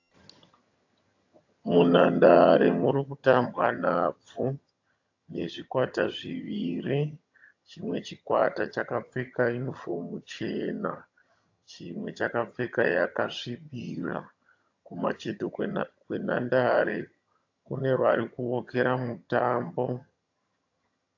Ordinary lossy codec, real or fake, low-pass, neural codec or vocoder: AAC, 48 kbps; fake; 7.2 kHz; vocoder, 22.05 kHz, 80 mel bands, HiFi-GAN